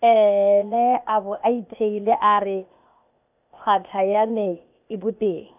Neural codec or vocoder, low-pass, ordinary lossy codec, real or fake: codec, 16 kHz, 0.8 kbps, ZipCodec; 3.6 kHz; none; fake